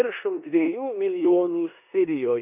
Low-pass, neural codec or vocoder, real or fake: 3.6 kHz; codec, 16 kHz in and 24 kHz out, 0.9 kbps, LongCat-Audio-Codec, four codebook decoder; fake